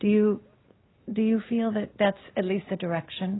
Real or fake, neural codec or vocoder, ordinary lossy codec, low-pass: real; none; AAC, 16 kbps; 7.2 kHz